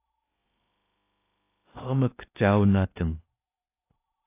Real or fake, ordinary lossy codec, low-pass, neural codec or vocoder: fake; AAC, 24 kbps; 3.6 kHz; codec, 16 kHz in and 24 kHz out, 0.8 kbps, FocalCodec, streaming, 65536 codes